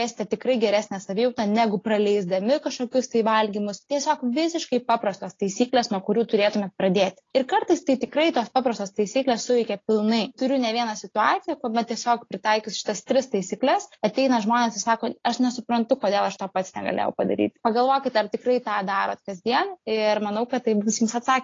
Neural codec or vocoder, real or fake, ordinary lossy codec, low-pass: none; real; AAC, 32 kbps; 7.2 kHz